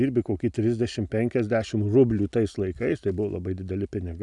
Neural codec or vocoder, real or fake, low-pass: none; real; 10.8 kHz